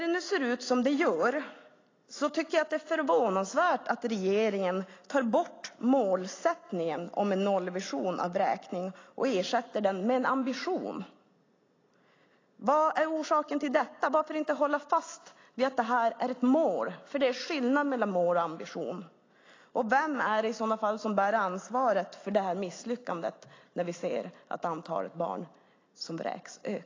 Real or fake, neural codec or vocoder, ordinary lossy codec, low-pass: real; none; AAC, 32 kbps; 7.2 kHz